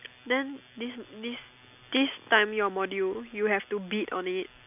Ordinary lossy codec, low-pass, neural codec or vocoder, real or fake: none; 3.6 kHz; none; real